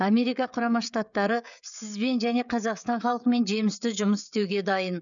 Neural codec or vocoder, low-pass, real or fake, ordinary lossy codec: codec, 16 kHz, 16 kbps, FreqCodec, smaller model; 7.2 kHz; fake; none